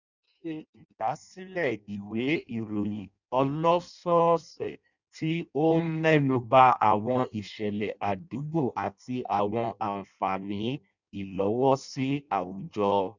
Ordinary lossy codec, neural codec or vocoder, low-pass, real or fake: none; codec, 16 kHz in and 24 kHz out, 0.6 kbps, FireRedTTS-2 codec; 7.2 kHz; fake